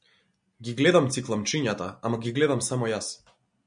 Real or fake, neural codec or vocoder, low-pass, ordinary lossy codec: real; none; 9.9 kHz; MP3, 96 kbps